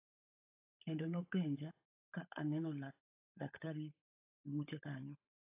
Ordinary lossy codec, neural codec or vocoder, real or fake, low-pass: AAC, 32 kbps; codec, 16 kHz, 16 kbps, FunCodec, trained on LibriTTS, 50 frames a second; fake; 3.6 kHz